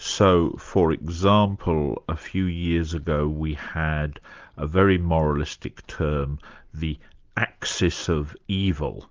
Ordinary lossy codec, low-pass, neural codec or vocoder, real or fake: Opus, 32 kbps; 7.2 kHz; none; real